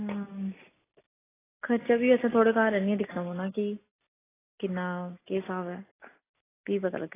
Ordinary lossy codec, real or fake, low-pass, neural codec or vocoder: AAC, 16 kbps; real; 3.6 kHz; none